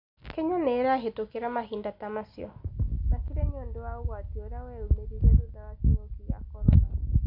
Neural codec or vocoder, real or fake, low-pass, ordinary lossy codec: none; real; 5.4 kHz; none